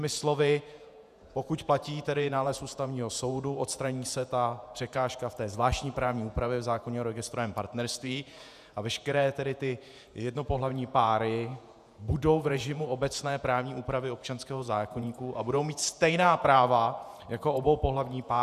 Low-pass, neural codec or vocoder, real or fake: 14.4 kHz; vocoder, 48 kHz, 128 mel bands, Vocos; fake